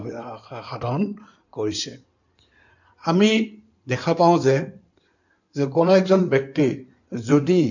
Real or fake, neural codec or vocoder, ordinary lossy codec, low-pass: fake; codec, 16 kHz in and 24 kHz out, 2.2 kbps, FireRedTTS-2 codec; none; 7.2 kHz